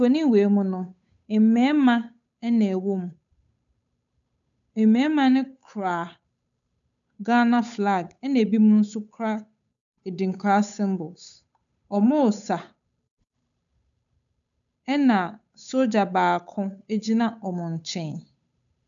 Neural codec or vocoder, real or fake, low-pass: codec, 16 kHz, 8 kbps, FunCodec, trained on Chinese and English, 25 frames a second; fake; 7.2 kHz